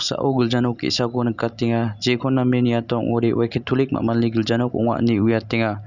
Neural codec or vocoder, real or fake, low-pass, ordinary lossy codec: none; real; 7.2 kHz; none